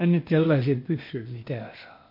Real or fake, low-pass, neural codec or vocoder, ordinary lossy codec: fake; 5.4 kHz; codec, 16 kHz, 0.8 kbps, ZipCodec; MP3, 32 kbps